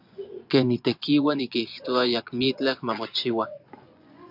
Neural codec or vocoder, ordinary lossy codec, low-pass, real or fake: vocoder, 24 kHz, 100 mel bands, Vocos; AAC, 48 kbps; 5.4 kHz; fake